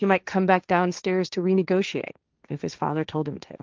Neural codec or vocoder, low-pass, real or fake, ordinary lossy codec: codec, 16 kHz, 1.1 kbps, Voila-Tokenizer; 7.2 kHz; fake; Opus, 24 kbps